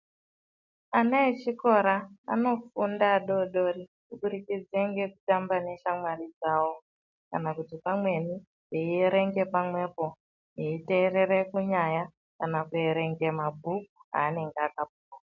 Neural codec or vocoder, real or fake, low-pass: none; real; 7.2 kHz